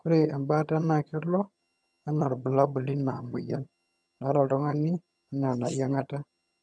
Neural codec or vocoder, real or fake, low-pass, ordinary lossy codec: vocoder, 22.05 kHz, 80 mel bands, HiFi-GAN; fake; none; none